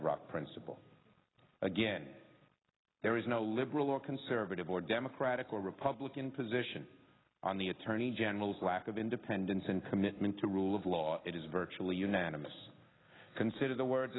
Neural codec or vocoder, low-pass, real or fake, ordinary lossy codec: none; 7.2 kHz; real; AAC, 16 kbps